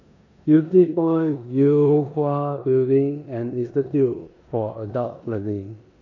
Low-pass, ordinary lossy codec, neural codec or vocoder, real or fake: 7.2 kHz; none; codec, 16 kHz in and 24 kHz out, 0.9 kbps, LongCat-Audio-Codec, four codebook decoder; fake